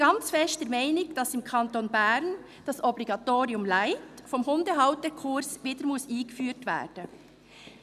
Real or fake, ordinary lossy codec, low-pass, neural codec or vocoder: real; none; 14.4 kHz; none